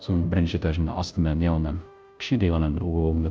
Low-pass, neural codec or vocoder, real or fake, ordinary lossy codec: none; codec, 16 kHz, 0.5 kbps, FunCodec, trained on Chinese and English, 25 frames a second; fake; none